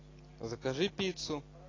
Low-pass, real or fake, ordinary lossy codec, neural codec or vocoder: 7.2 kHz; real; AAC, 32 kbps; none